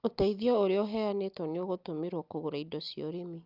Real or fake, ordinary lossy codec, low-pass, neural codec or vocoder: real; Opus, 24 kbps; 5.4 kHz; none